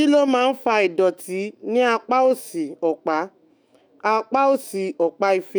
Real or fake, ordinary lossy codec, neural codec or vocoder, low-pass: fake; none; autoencoder, 48 kHz, 128 numbers a frame, DAC-VAE, trained on Japanese speech; none